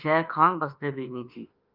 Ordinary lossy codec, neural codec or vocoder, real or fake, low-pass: Opus, 24 kbps; autoencoder, 48 kHz, 32 numbers a frame, DAC-VAE, trained on Japanese speech; fake; 5.4 kHz